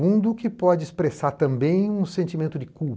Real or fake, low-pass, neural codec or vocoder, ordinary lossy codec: real; none; none; none